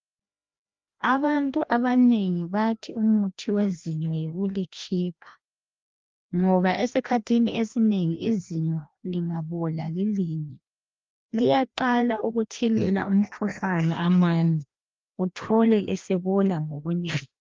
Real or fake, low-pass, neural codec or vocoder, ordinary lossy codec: fake; 7.2 kHz; codec, 16 kHz, 1 kbps, FreqCodec, larger model; Opus, 32 kbps